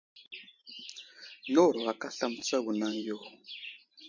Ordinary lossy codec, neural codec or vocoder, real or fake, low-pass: MP3, 48 kbps; vocoder, 44.1 kHz, 128 mel bands every 512 samples, BigVGAN v2; fake; 7.2 kHz